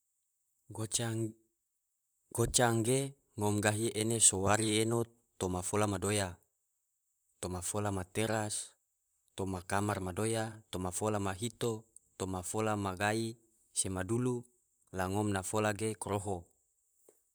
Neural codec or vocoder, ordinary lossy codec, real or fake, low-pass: vocoder, 44.1 kHz, 128 mel bands, Pupu-Vocoder; none; fake; none